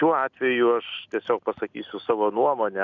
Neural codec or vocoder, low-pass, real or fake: none; 7.2 kHz; real